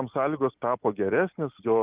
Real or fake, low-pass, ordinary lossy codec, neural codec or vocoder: real; 3.6 kHz; Opus, 32 kbps; none